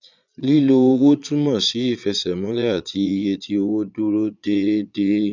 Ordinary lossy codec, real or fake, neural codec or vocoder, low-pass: none; fake; vocoder, 22.05 kHz, 80 mel bands, Vocos; 7.2 kHz